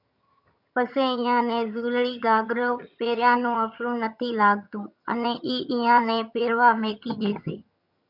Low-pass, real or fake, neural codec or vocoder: 5.4 kHz; fake; vocoder, 22.05 kHz, 80 mel bands, HiFi-GAN